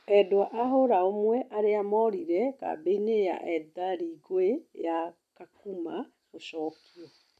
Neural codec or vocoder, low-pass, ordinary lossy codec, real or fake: none; 14.4 kHz; none; real